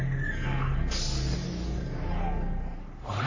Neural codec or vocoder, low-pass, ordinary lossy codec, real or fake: codec, 44.1 kHz, 3.4 kbps, Pupu-Codec; 7.2 kHz; AAC, 48 kbps; fake